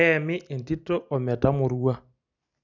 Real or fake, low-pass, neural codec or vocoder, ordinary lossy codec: real; 7.2 kHz; none; none